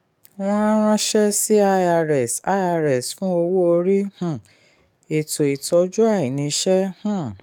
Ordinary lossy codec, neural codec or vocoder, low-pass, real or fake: none; codec, 44.1 kHz, 7.8 kbps, DAC; 19.8 kHz; fake